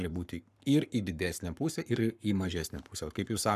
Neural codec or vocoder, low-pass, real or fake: codec, 44.1 kHz, 7.8 kbps, Pupu-Codec; 14.4 kHz; fake